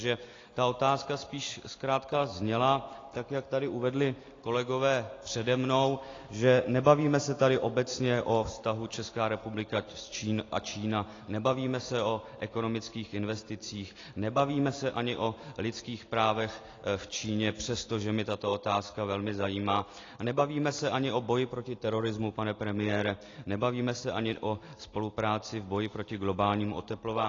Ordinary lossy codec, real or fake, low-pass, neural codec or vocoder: AAC, 32 kbps; real; 7.2 kHz; none